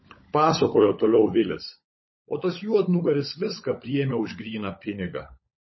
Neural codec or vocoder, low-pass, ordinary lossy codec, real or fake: codec, 16 kHz, 16 kbps, FunCodec, trained on LibriTTS, 50 frames a second; 7.2 kHz; MP3, 24 kbps; fake